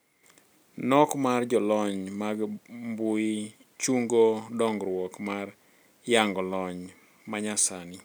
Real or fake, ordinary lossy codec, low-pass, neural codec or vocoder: real; none; none; none